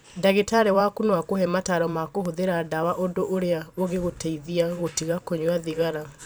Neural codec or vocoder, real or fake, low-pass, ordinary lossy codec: vocoder, 44.1 kHz, 128 mel bands every 512 samples, BigVGAN v2; fake; none; none